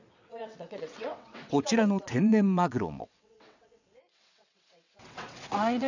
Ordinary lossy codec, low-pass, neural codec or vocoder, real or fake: none; 7.2 kHz; vocoder, 22.05 kHz, 80 mel bands, WaveNeXt; fake